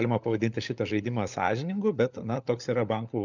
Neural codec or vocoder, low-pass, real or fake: codec, 16 kHz, 16 kbps, FunCodec, trained on LibriTTS, 50 frames a second; 7.2 kHz; fake